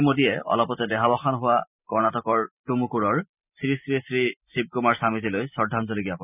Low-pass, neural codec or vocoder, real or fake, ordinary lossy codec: 3.6 kHz; none; real; none